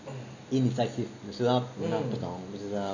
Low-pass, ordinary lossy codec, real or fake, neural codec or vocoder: 7.2 kHz; none; real; none